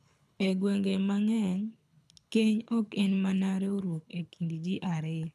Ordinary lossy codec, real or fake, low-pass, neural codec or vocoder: none; fake; none; codec, 24 kHz, 6 kbps, HILCodec